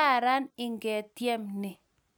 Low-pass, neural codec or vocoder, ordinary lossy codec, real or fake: none; none; none; real